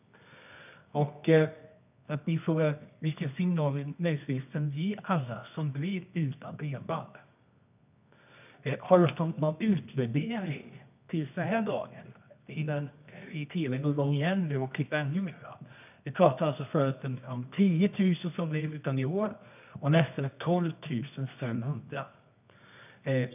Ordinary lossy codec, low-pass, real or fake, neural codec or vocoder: none; 3.6 kHz; fake; codec, 24 kHz, 0.9 kbps, WavTokenizer, medium music audio release